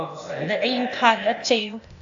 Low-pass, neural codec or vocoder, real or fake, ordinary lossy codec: 7.2 kHz; codec, 16 kHz, 0.8 kbps, ZipCodec; fake; AAC, 64 kbps